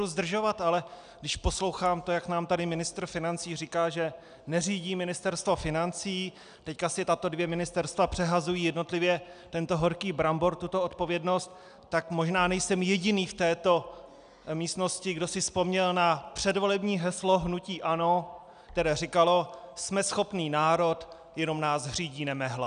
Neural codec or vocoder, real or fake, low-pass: none; real; 9.9 kHz